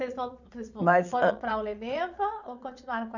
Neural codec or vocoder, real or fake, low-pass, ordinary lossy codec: vocoder, 22.05 kHz, 80 mel bands, Vocos; fake; 7.2 kHz; none